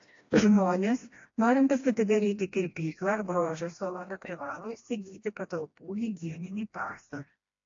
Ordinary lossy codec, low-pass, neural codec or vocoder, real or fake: AAC, 48 kbps; 7.2 kHz; codec, 16 kHz, 1 kbps, FreqCodec, smaller model; fake